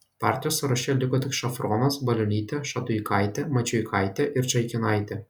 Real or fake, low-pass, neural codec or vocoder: real; 19.8 kHz; none